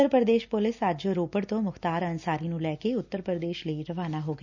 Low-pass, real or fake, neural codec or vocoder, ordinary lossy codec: 7.2 kHz; real; none; none